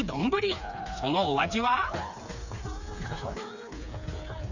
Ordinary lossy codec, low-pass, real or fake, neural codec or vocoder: none; 7.2 kHz; fake; codec, 16 kHz, 4 kbps, FreqCodec, smaller model